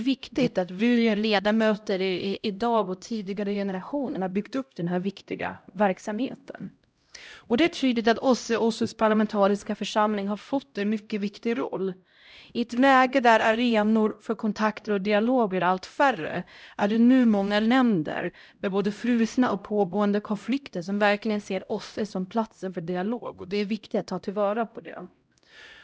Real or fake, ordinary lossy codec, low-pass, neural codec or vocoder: fake; none; none; codec, 16 kHz, 0.5 kbps, X-Codec, HuBERT features, trained on LibriSpeech